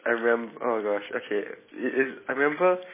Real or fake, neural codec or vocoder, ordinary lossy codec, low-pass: real; none; MP3, 16 kbps; 3.6 kHz